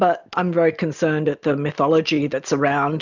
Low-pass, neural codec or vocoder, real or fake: 7.2 kHz; none; real